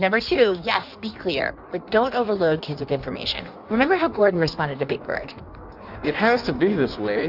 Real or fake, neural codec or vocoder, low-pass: fake; codec, 16 kHz in and 24 kHz out, 1.1 kbps, FireRedTTS-2 codec; 5.4 kHz